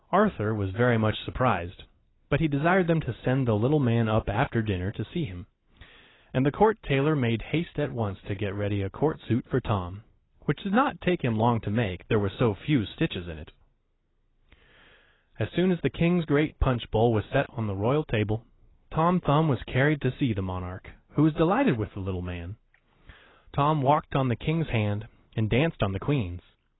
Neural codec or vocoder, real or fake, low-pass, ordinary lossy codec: none; real; 7.2 kHz; AAC, 16 kbps